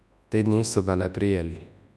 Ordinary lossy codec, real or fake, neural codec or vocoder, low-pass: none; fake; codec, 24 kHz, 0.9 kbps, WavTokenizer, large speech release; none